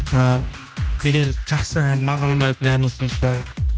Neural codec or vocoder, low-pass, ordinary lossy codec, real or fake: codec, 16 kHz, 1 kbps, X-Codec, HuBERT features, trained on general audio; none; none; fake